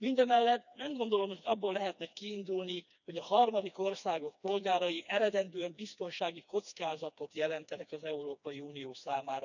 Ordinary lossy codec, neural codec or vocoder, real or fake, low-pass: none; codec, 16 kHz, 2 kbps, FreqCodec, smaller model; fake; 7.2 kHz